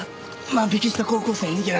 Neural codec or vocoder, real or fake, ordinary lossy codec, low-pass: none; real; none; none